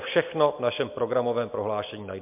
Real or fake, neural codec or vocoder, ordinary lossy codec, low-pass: real; none; MP3, 32 kbps; 3.6 kHz